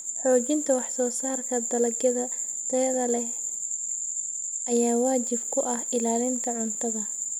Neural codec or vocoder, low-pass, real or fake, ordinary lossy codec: none; 19.8 kHz; real; none